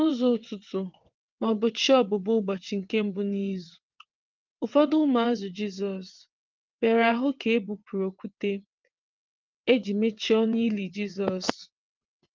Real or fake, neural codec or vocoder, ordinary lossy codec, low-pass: fake; vocoder, 22.05 kHz, 80 mel bands, WaveNeXt; Opus, 24 kbps; 7.2 kHz